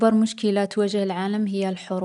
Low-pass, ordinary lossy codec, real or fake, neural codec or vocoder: 10.8 kHz; none; real; none